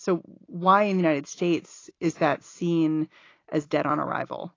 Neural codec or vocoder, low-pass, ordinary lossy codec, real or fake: none; 7.2 kHz; AAC, 32 kbps; real